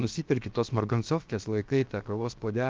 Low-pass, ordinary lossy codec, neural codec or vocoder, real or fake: 7.2 kHz; Opus, 24 kbps; codec, 16 kHz, about 1 kbps, DyCAST, with the encoder's durations; fake